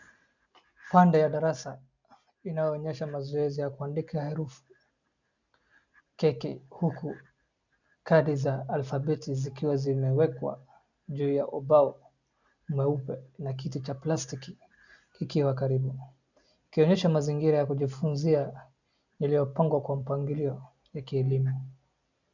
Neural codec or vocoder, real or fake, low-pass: none; real; 7.2 kHz